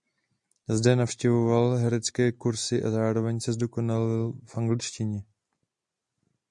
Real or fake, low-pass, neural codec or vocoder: real; 10.8 kHz; none